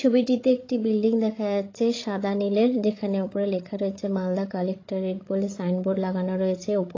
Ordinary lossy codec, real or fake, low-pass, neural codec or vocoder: AAC, 32 kbps; fake; 7.2 kHz; codec, 16 kHz, 16 kbps, FreqCodec, larger model